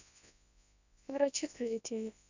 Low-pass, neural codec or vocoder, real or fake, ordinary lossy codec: 7.2 kHz; codec, 24 kHz, 0.9 kbps, WavTokenizer, large speech release; fake; none